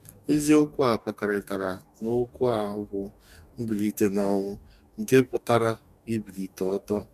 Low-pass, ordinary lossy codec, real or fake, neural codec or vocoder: 14.4 kHz; none; fake; codec, 44.1 kHz, 2.6 kbps, DAC